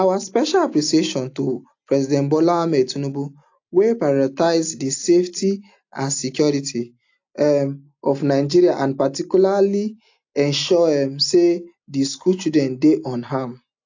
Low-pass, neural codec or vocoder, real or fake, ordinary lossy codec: 7.2 kHz; none; real; AAC, 48 kbps